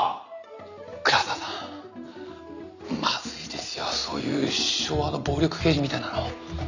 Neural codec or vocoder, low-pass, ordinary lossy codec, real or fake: none; 7.2 kHz; none; real